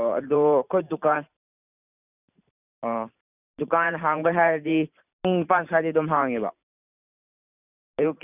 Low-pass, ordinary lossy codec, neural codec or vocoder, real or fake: 3.6 kHz; none; vocoder, 44.1 kHz, 128 mel bands every 512 samples, BigVGAN v2; fake